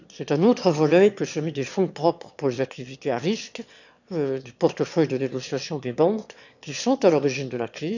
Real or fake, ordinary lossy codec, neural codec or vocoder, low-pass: fake; none; autoencoder, 22.05 kHz, a latent of 192 numbers a frame, VITS, trained on one speaker; 7.2 kHz